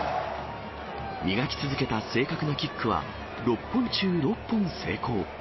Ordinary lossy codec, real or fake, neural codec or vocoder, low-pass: MP3, 24 kbps; real; none; 7.2 kHz